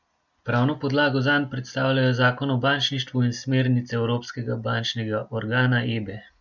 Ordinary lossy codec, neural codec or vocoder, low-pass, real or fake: none; none; 7.2 kHz; real